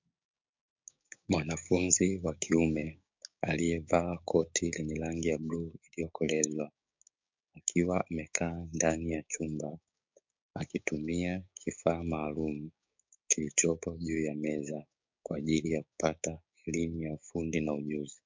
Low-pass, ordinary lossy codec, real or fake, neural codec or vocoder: 7.2 kHz; MP3, 64 kbps; fake; codec, 16 kHz, 6 kbps, DAC